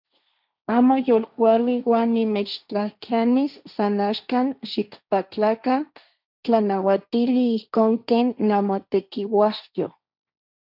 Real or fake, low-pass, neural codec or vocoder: fake; 5.4 kHz; codec, 16 kHz, 1.1 kbps, Voila-Tokenizer